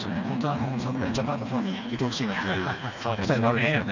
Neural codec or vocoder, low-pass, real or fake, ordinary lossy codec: codec, 16 kHz, 2 kbps, FreqCodec, smaller model; 7.2 kHz; fake; none